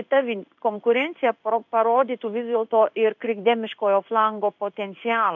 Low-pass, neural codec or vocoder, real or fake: 7.2 kHz; codec, 16 kHz in and 24 kHz out, 1 kbps, XY-Tokenizer; fake